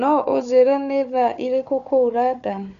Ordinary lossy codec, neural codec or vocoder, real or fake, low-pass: none; codec, 16 kHz, 4 kbps, FunCodec, trained on Chinese and English, 50 frames a second; fake; 7.2 kHz